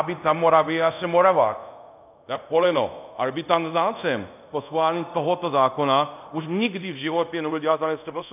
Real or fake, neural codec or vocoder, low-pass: fake; codec, 24 kHz, 0.5 kbps, DualCodec; 3.6 kHz